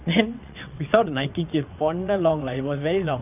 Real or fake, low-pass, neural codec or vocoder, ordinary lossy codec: fake; 3.6 kHz; vocoder, 22.05 kHz, 80 mel bands, WaveNeXt; none